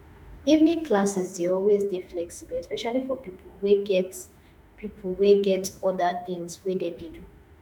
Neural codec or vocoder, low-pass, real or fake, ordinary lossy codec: autoencoder, 48 kHz, 32 numbers a frame, DAC-VAE, trained on Japanese speech; 19.8 kHz; fake; none